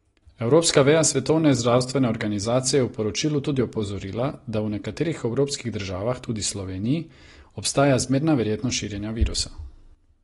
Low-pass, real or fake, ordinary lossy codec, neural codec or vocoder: 10.8 kHz; real; AAC, 32 kbps; none